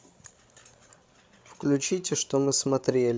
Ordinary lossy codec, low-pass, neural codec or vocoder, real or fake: none; none; codec, 16 kHz, 16 kbps, FreqCodec, larger model; fake